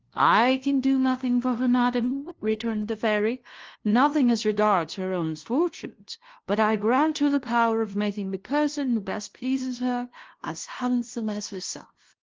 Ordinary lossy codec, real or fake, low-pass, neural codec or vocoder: Opus, 16 kbps; fake; 7.2 kHz; codec, 16 kHz, 0.5 kbps, FunCodec, trained on LibriTTS, 25 frames a second